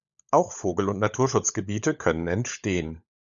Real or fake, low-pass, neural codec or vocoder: fake; 7.2 kHz; codec, 16 kHz, 16 kbps, FunCodec, trained on LibriTTS, 50 frames a second